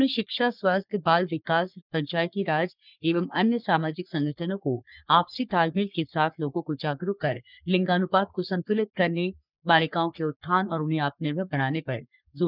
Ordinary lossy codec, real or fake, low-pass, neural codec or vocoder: none; fake; 5.4 kHz; codec, 44.1 kHz, 3.4 kbps, Pupu-Codec